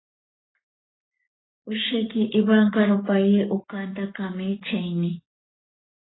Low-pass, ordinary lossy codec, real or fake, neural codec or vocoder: 7.2 kHz; AAC, 16 kbps; fake; codec, 44.1 kHz, 7.8 kbps, Pupu-Codec